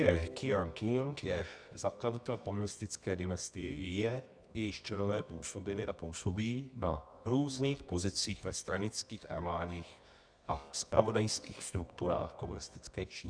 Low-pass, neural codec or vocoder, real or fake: 9.9 kHz; codec, 24 kHz, 0.9 kbps, WavTokenizer, medium music audio release; fake